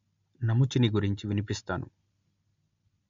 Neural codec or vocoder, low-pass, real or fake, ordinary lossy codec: none; 7.2 kHz; real; MP3, 48 kbps